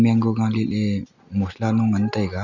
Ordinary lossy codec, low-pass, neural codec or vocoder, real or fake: none; 7.2 kHz; none; real